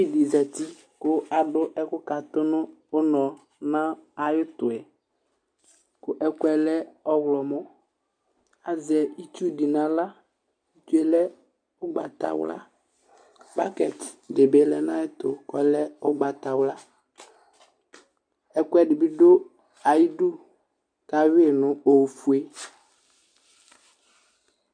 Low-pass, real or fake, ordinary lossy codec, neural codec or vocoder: 9.9 kHz; real; MP3, 64 kbps; none